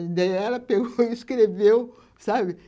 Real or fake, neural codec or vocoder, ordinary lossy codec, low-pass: real; none; none; none